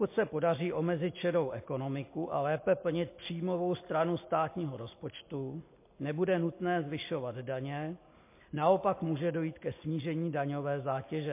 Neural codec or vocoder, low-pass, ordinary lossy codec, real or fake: none; 3.6 kHz; MP3, 24 kbps; real